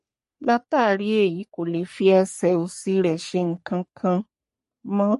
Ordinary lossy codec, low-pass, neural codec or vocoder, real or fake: MP3, 48 kbps; 14.4 kHz; codec, 44.1 kHz, 3.4 kbps, Pupu-Codec; fake